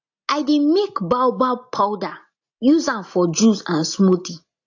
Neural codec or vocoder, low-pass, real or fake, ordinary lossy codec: none; 7.2 kHz; real; AAC, 48 kbps